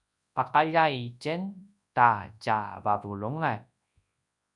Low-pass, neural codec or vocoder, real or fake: 10.8 kHz; codec, 24 kHz, 0.9 kbps, WavTokenizer, large speech release; fake